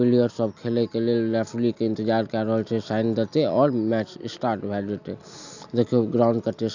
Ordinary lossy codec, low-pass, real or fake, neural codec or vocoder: none; 7.2 kHz; real; none